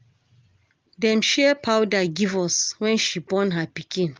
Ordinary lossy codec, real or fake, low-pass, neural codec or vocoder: Opus, 32 kbps; real; 7.2 kHz; none